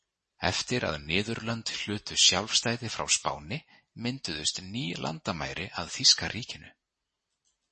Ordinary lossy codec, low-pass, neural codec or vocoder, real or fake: MP3, 32 kbps; 9.9 kHz; none; real